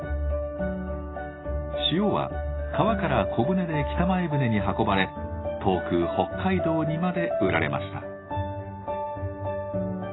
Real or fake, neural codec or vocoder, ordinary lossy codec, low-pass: real; none; AAC, 16 kbps; 7.2 kHz